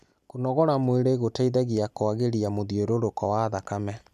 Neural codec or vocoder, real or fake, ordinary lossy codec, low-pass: none; real; none; 14.4 kHz